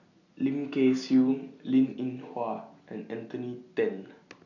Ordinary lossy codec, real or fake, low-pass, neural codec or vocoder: none; real; 7.2 kHz; none